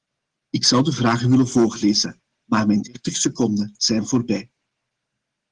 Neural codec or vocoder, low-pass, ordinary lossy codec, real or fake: none; 9.9 kHz; Opus, 16 kbps; real